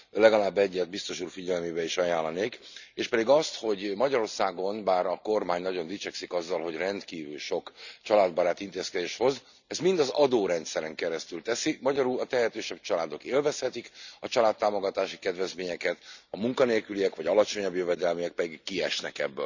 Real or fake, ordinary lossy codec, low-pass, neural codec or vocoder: real; none; 7.2 kHz; none